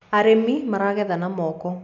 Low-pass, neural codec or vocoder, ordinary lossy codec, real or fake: 7.2 kHz; none; none; real